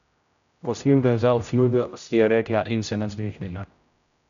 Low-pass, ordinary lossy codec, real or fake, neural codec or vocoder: 7.2 kHz; MP3, 96 kbps; fake; codec, 16 kHz, 0.5 kbps, X-Codec, HuBERT features, trained on general audio